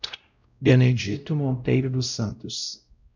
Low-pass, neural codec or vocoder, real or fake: 7.2 kHz; codec, 16 kHz, 0.5 kbps, X-Codec, WavLM features, trained on Multilingual LibriSpeech; fake